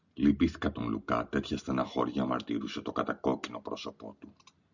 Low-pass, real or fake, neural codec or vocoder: 7.2 kHz; real; none